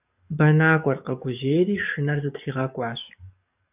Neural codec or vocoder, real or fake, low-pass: codec, 44.1 kHz, 7.8 kbps, DAC; fake; 3.6 kHz